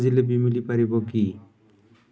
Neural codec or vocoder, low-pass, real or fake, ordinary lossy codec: none; none; real; none